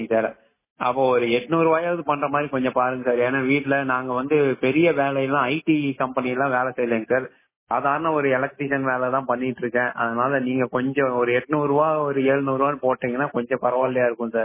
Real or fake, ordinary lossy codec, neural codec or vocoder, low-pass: real; MP3, 16 kbps; none; 3.6 kHz